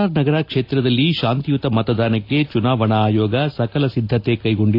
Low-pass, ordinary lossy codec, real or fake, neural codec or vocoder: 5.4 kHz; AAC, 32 kbps; real; none